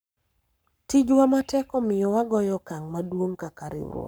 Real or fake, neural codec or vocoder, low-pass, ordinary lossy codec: fake; codec, 44.1 kHz, 7.8 kbps, Pupu-Codec; none; none